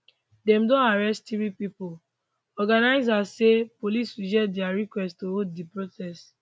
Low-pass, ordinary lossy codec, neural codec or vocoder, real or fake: none; none; none; real